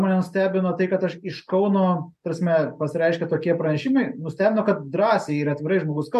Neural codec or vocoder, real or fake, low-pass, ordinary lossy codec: none; real; 14.4 kHz; MP3, 64 kbps